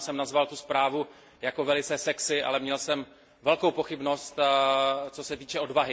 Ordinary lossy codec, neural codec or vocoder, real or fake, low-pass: none; none; real; none